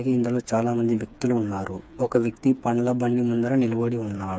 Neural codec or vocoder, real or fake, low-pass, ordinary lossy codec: codec, 16 kHz, 4 kbps, FreqCodec, smaller model; fake; none; none